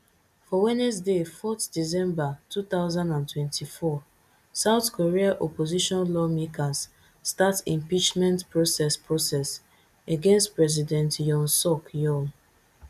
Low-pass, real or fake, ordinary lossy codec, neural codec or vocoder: 14.4 kHz; real; none; none